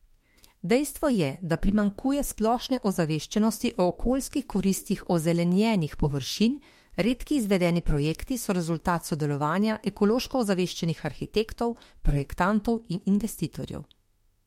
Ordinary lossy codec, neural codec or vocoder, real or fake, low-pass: MP3, 64 kbps; autoencoder, 48 kHz, 32 numbers a frame, DAC-VAE, trained on Japanese speech; fake; 19.8 kHz